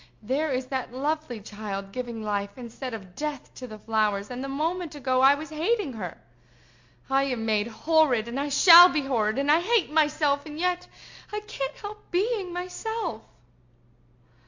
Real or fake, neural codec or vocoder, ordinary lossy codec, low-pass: real; none; MP3, 48 kbps; 7.2 kHz